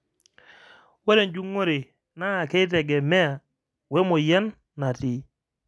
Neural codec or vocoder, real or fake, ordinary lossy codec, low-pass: none; real; none; none